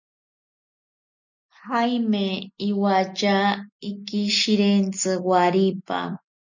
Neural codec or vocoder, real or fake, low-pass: none; real; 7.2 kHz